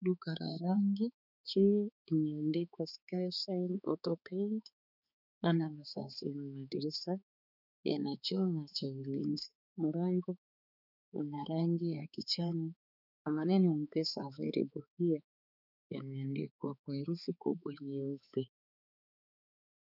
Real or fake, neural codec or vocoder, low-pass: fake; codec, 16 kHz, 4 kbps, X-Codec, HuBERT features, trained on balanced general audio; 5.4 kHz